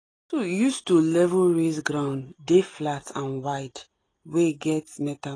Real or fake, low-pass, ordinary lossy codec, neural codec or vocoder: real; 9.9 kHz; AAC, 32 kbps; none